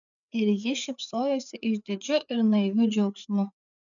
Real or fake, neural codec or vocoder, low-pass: fake; codec, 16 kHz, 4 kbps, FreqCodec, larger model; 7.2 kHz